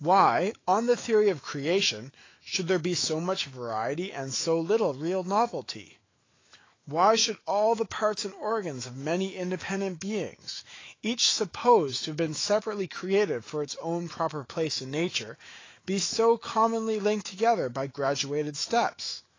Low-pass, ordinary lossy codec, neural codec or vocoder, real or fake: 7.2 kHz; AAC, 32 kbps; autoencoder, 48 kHz, 128 numbers a frame, DAC-VAE, trained on Japanese speech; fake